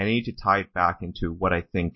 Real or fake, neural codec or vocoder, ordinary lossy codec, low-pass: real; none; MP3, 24 kbps; 7.2 kHz